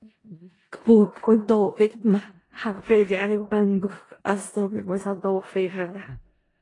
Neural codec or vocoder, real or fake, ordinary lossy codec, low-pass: codec, 16 kHz in and 24 kHz out, 0.4 kbps, LongCat-Audio-Codec, four codebook decoder; fake; AAC, 32 kbps; 10.8 kHz